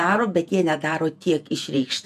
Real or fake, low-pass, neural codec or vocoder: fake; 14.4 kHz; vocoder, 48 kHz, 128 mel bands, Vocos